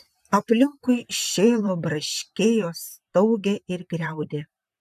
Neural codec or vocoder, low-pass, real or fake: vocoder, 44.1 kHz, 128 mel bands, Pupu-Vocoder; 14.4 kHz; fake